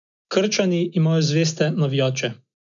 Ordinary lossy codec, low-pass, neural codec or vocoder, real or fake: none; 7.2 kHz; none; real